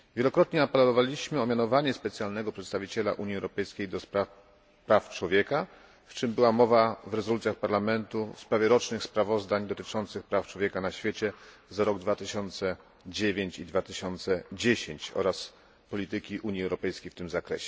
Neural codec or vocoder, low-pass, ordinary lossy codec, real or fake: none; none; none; real